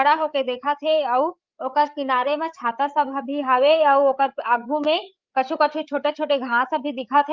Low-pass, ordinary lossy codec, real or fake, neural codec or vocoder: 7.2 kHz; Opus, 24 kbps; fake; codec, 16 kHz, 8 kbps, FreqCodec, larger model